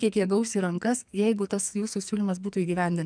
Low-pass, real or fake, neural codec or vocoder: 9.9 kHz; fake; codec, 44.1 kHz, 2.6 kbps, SNAC